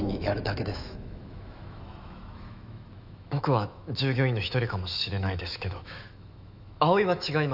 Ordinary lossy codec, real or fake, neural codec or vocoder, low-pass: none; fake; autoencoder, 48 kHz, 128 numbers a frame, DAC-VAE, trained on Japanese speech; 5.4 kHz